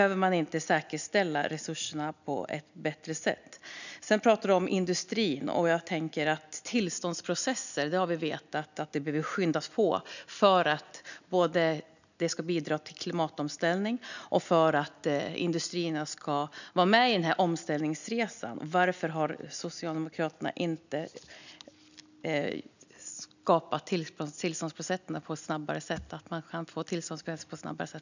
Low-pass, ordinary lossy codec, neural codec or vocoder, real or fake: 7.2 kHz; none; none; real